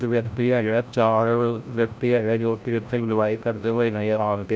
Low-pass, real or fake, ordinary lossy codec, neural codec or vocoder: none; fake; none; codec, 16 kHz, 0.5 kbps, FreqCodec, larger model